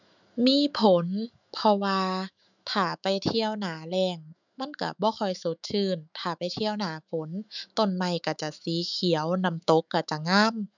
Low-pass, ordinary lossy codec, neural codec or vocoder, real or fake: 7.2 kHz; none; autoencoder, 48 kHz, 128 numbers a frame, DAC-VAE, trained on Japanese speech; fake